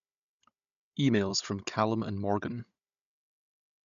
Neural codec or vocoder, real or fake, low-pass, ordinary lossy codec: codec, 16 kHz, 16 kbps, FunCodec, trained on Chinese and English, 50 frames a second; fake; 7.2 kHz; MP3, 96 kbps